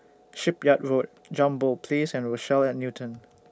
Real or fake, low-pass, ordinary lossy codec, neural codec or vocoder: real; none; none; none